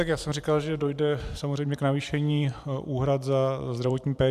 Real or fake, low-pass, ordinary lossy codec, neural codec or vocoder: fake; 14.4 kHz; AAC, 96 kbps; vocoder, 44.1 kHz, 128 mel bands every 512 samples, BigVGAN v2